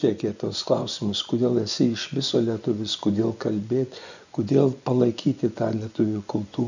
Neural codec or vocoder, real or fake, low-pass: vocoder, 24 kHz, 100 mel bands, Vocos; fake; 7.2 kHz